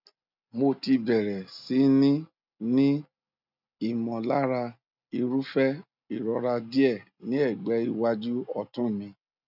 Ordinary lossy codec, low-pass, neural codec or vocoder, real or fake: none; 5.4 kHz; none; real